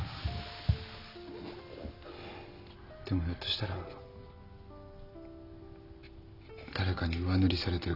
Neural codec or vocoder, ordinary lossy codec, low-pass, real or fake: none; none; 5.4 kHz; real